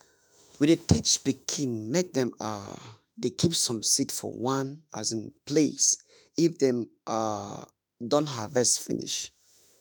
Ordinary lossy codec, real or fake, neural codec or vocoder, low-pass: none; fake; autoencoder, 48 kHz, 32 numbers a frame, DAC-VAE, trained on Japanese speech; none